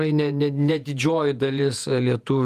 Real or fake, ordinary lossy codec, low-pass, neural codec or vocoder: fake; Opus, 32 kbps; 14.4 kHz; vocoder, 48 kHz, 128 mel bands, Vocos